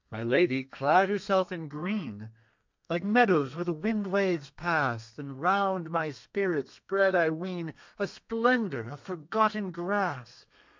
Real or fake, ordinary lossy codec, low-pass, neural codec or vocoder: fake; MP3, 64 kbps; 7.2 kHz; codec, 32 kHz, 1.9 kbps, SNAC